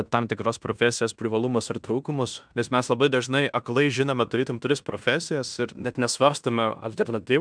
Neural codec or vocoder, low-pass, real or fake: codec, 16 kHz in and 24 kHz out, 0.9 kbps, LongCat-Audio-Codec, fine tuned four codebook decoder; 9.9 kHz; fake